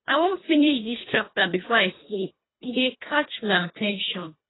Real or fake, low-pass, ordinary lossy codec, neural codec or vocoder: fake; 7.2 kHz; AAC, 16 kbps; codec, 24 kHz, 1.5 kbps, HILCodec